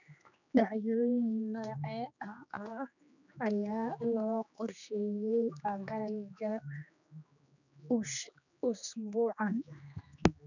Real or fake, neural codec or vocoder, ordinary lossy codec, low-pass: fake; codec, 16 kHz, 2 kbps, X-Codec, HuBERT features, trained on general audio; none; 7.2 kHz